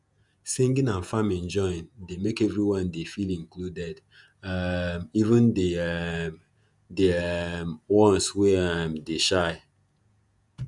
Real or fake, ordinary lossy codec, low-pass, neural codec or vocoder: fake; none; 10.8 kHz; vocoder, 48 kHz, 128 mel bands, Vocos